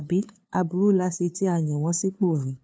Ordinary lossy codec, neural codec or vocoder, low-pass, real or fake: none; codec, 16 kHz, 2 kbps, FunCodec, trained on LibriTTS, 25 frames a second; none; fake